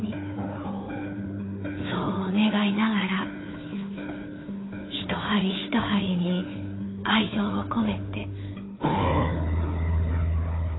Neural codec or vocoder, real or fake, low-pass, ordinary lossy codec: codec, 16 kHz, 4 kbps, FunCodec, trained on Chinese and English, 50 frames a second; fake; 7.2 kHz; AAC, 16 kbps